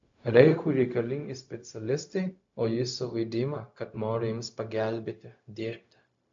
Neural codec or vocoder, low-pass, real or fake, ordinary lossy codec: codec, 16 kHz, 0.4 kbps, LongCat-Audio-Codec; 7.2 kHz; fake; AAC, 64 kbps